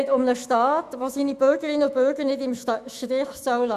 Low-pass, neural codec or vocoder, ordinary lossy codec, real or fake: 14.4 kHz; autoencoder, 48 kHz, 128 numbers a frame, DAC-VAE, trained on Japanese speech; none; fake